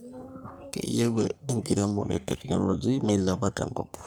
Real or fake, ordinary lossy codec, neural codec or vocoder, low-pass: fake; none; codec, 44.1 kHz, 3.4 kbps, Pupu-Codec; none